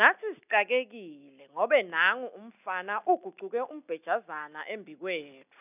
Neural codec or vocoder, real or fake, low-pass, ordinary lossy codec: none; real; 3.6 kHz; none